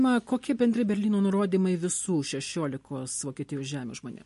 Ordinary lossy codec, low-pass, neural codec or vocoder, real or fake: MP3, 48 kbps; 14.4 kHz; none; real